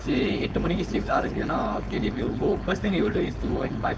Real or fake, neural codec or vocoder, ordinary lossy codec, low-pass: fake; codec, 16 kHz, 4.8 kbps, FACodec; none; none